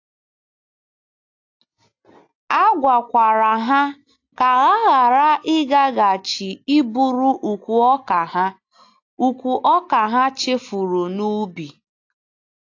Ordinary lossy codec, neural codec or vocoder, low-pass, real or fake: AAC, 48 kbps; none; 7.2 kHz; real